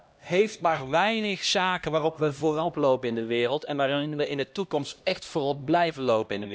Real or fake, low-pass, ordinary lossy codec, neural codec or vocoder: fake; none; none; codec, 16 kHz, 1 kbps, X-Codec, HuBERT features, trained on LibriSpeech